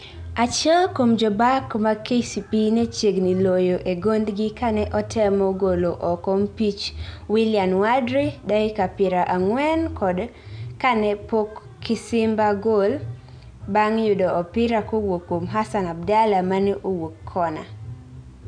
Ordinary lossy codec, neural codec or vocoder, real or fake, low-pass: none; none; real; 9.9 kHz